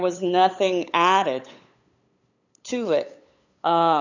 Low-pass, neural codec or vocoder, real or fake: 7.2 kHz; codec, 16 kHz, 8 kbps, FunCodec, trained on LibriTTS, 25 frames a second; fake